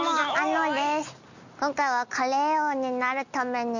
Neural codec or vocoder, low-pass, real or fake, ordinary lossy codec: none; 7.2 kHz; real; none